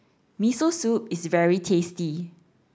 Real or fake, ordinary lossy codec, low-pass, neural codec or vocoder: real; none; none; none